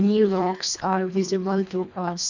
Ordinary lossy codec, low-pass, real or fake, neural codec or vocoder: none; 7.2 kHz; fake; codec, 24 kHz, 1.5 kbps, HILCodec